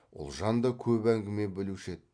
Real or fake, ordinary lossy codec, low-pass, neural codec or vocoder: real; MP3, 64 kbps; 9.9 kHz; none